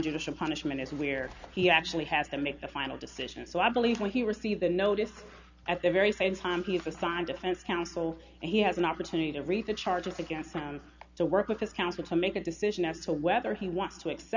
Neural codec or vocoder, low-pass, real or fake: none; 7.2 kHz; real